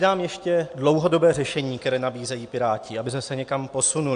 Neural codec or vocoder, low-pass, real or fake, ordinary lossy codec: none; 9.9 kHz; real; AAC, 64 kbps